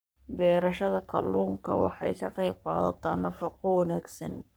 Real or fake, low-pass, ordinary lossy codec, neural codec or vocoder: fake; none; none; codec, 44.1 kHz, 3.4 kbps, Pupu-Codec